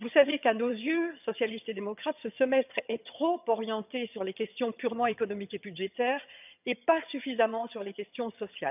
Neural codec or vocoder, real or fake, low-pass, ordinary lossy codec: vocoder, 22.05 kHz, 80 mel bands, HiFi-GAN; fake; 3.6 kHz; none